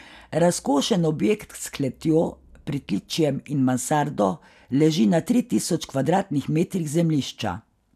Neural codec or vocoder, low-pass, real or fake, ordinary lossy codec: none; 14.4 kHz; real; none